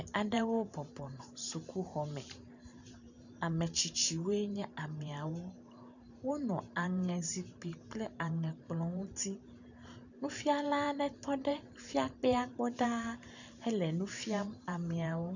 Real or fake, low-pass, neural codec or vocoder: fake; 7.2 kHz; vocoder, 44.1 kHz, 128 mel bands every 512 samples, BigVGAN v2